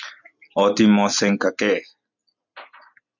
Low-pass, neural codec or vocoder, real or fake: 7.2 kHz; none; real